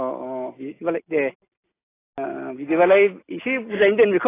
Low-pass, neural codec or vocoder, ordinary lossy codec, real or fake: 3.6 kHz; none; AAC, 16 kbps; real